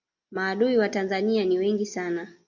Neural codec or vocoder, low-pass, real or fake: none; 7.2 kHz; real